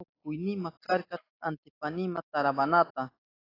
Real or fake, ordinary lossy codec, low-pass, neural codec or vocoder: real; AAC, 24 kbps; 5.4 kHz; none